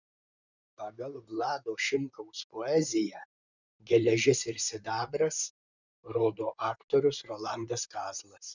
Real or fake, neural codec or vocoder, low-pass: fake; vocoder, 44.1 kHz, 128 mel bands, Pupu-Vocoder; 7.2 kHz